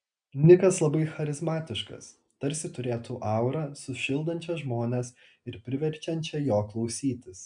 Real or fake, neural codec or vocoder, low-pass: real; none; 9.9 kHz